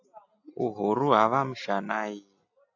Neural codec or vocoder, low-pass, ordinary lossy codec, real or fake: none; 7.2 kHz; AAC, 48 kbps; real